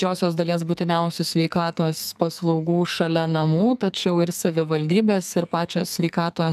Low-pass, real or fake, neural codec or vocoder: 14.4 kHz; fake; codec, 44.1 kHz, 2.6 kbps, SNAC